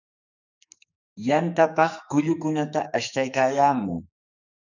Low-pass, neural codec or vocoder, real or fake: 7.2 kHz; codec, 44.1 kHz, 2.6 kbps, SNAC; fake